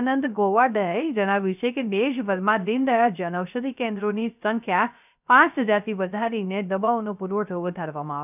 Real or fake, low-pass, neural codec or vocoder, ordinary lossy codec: fake; 3.6 kHz; codec, 16 kHz, 0.3 kbps, FocalCodec; none